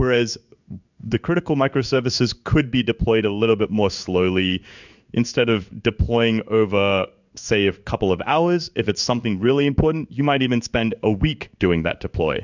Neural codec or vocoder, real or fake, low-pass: codec, 16 kHz in and 24 kHz out, 1 kbps, XY-Tokenizer; fake; 7.2 kHz